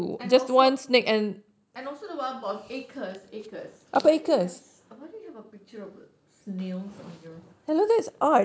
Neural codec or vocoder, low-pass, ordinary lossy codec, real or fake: none; none; none; real